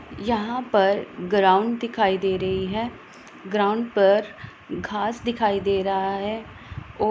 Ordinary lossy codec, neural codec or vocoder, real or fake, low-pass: none; none; real; none